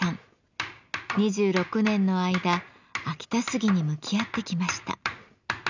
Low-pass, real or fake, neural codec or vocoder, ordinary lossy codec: 7.2 kHz; real; none; none